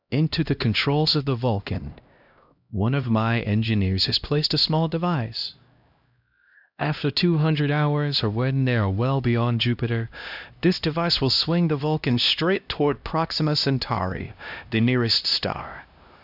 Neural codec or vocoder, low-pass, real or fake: codec, 16 kHz, 1 kbps, X-Codec, HuBERT features, trained on LibriSpeech; 5.4 kHz; fake